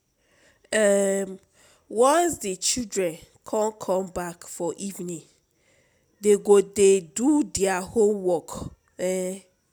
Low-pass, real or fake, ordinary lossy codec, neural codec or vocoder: none; real; none; none